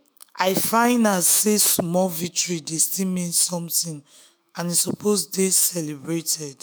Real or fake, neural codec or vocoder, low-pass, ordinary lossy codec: fake; autoencoder, 48 kHz, 128 numbers a frame, DAC-VAE, trained on Japanese speech; none; none